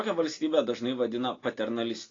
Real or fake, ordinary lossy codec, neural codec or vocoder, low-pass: real; AAC, 32 kbps; none; 7.2 kHz